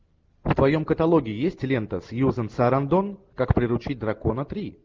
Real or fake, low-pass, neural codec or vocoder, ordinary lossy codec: real; 7.2 kHz; none; Opus, 32 kbps